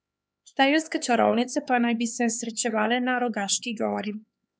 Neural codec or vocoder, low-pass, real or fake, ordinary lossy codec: codec, 16 kHz, 4 kbps, X-Codec, HuBERT features, trained on LibriSpeech; none; fake; none